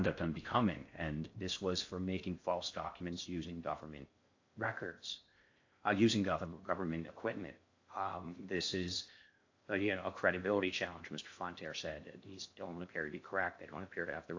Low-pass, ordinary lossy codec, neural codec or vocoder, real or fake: 7.2 kHz; AAC, 48 kbps; codec, 16 kHz in and 24 kHz out, 0.6 kbps, FocalCodec, streaming, 4096 codes; fake